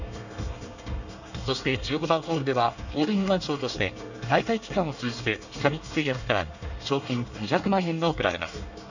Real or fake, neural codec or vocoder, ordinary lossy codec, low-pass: fake; codec, 24 kHz, 1 kbps, SNAC; none; 7.2 kHz